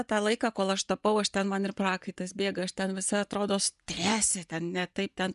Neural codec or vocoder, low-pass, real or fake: vocoder, 24 kHz, 100 mel bands, Vocos; 10.8 kHz; fake